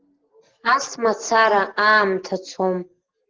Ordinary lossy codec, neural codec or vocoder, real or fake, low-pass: Opus, 16 kbps; none; real; 7.2 kHz